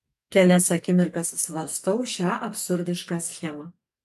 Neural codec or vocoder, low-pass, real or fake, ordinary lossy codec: codec, 44.1 kHz, 2.6 kbps, SNAC; 14.4 kHz; fake; MP3, 96 kbps